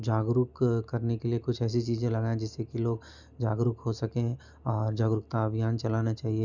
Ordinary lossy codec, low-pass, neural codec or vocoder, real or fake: none; 7.2 kHz; none; real